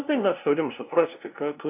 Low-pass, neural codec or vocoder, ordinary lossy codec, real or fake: 3.6 kHz; codec, 16 kHz, 0.5 kbps, FunCodec, trained on LibriTTS, 25 frames a second; AAC, 24 kbps; fake